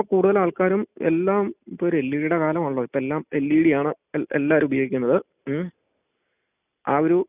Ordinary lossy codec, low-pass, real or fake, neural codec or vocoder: none; 3.6 kHz; real; none